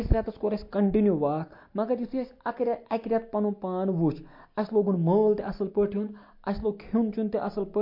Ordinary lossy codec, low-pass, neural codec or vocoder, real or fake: MP3, 32 kbps; 5.4 kHz; none; real